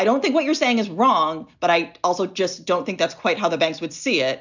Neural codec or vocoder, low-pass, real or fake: none; 7.2 kHz; real